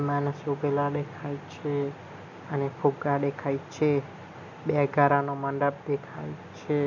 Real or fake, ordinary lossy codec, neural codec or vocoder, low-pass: real; none; none; 7.2 kHz